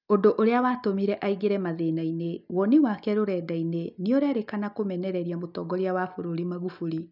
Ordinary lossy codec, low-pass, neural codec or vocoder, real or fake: none; 5.4 kHz; none; real